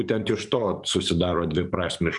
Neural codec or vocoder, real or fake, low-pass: codec, 44.1 kHz, 7.8 kbps, DAC; fake; 10.8 kHz